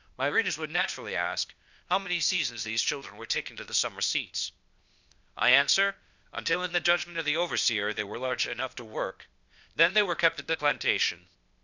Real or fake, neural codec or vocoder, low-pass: fake; codec, 16 kHz, 0.8 kbps, ZipCodec; 7.2 kHz